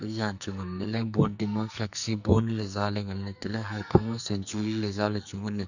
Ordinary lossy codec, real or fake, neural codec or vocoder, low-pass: none; fake; codec, 44.1 kHz, 2.6 kbps, SNAC; 7.2 kHz